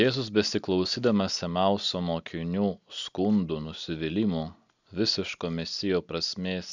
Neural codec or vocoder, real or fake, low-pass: none; real; 7.2 kHz